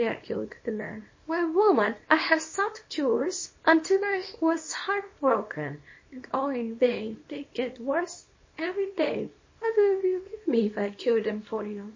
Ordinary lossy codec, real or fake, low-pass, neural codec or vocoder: MP3, 32 kbps; fake; 7.2 kHz; codec, 24 kHz, 0.9 kbps, WavTokenizer, small release